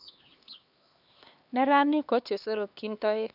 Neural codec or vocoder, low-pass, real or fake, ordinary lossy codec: codec, 16 kHz, 2 kbps, X-Codec, HuBERT features, trained on LibriSpeech; 5.4 kHz; fake; none